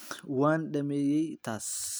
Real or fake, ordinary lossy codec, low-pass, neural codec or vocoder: real; none; none; none